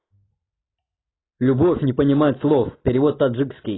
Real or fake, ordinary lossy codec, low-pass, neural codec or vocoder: real; AAC, 16 kbps; 7.2 kHz; none